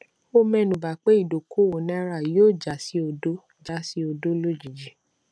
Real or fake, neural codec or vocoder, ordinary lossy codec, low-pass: real; none; none; none